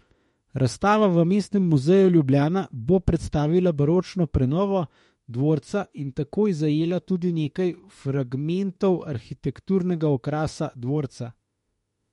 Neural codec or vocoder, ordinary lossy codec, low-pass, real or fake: autoencoder, 48 kHz, 32 numbers a frame, DAC-VAE, trained on Japanese speech; MP3, 48 kbps; 19.8 kHz; fake